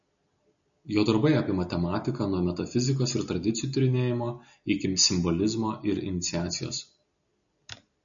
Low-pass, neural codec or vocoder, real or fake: 7.2 kHz; none; real